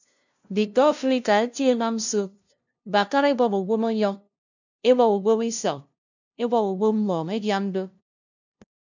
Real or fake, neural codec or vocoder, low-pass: fake; codec, 16 kHz, 0.5 kbps, FunCodec, trained on LibriTTS, 25 frames a second; 7.2 kHz